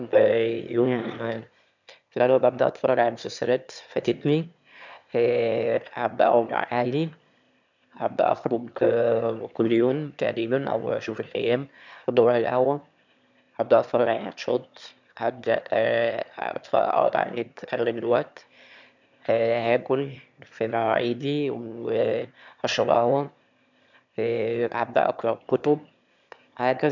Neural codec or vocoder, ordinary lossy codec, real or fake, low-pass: autoencoder, 22.05 kHz, a latent of 192 numbers a frame, VITS, trained on one speaker; none; fake; 7.2 kHz